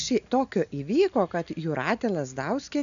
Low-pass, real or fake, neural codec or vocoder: 7.2 kHz; real; none